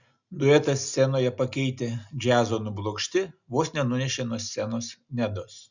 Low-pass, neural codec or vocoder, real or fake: 7.2 kHz; none; real